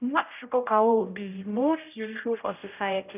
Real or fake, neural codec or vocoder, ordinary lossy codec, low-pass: fake; codec, 16 kHz, 0.5 kbps, X-Codec, HuBERT features, trained on general audio; none; 3.6 kHz